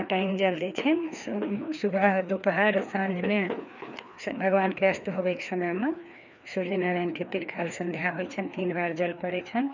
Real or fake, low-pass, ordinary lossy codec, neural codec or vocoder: fake; 7.2 kHz; none; codec, 16 kHz, 2 kbps, FreqCodec, larger model